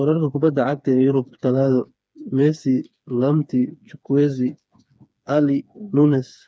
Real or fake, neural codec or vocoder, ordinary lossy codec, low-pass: fake; codec, 16 kHz, 4 kbps, FreqCodec, smaller model; none; none